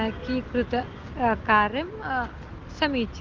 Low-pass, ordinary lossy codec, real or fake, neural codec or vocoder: 7.2 kHz; Opus, 16 kbps; real; none